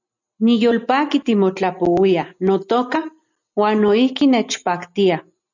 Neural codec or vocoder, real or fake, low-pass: none; real; 7.2 kHz